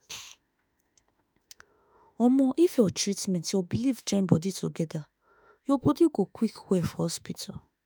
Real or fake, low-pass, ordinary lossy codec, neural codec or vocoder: fake; none; none; autoencoder, 48 kHz, 32 numbers a frame, DAC-VAE, trained on Japanese speech